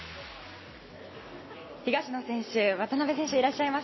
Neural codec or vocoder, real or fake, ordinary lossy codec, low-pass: none; real; MP3, 24 kbps; 7.2 kHz